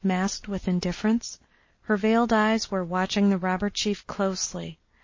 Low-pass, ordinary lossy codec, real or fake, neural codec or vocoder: 7.2 kHz; MP3, 32 kbps; real; none